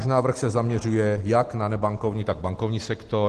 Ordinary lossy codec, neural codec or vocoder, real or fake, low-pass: Opus, 16 kbps; none; real; 10.8 kHz